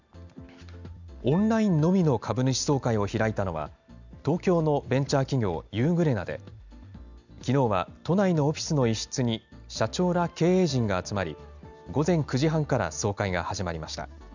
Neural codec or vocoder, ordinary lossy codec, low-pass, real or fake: none; none; 7.2 kHz; real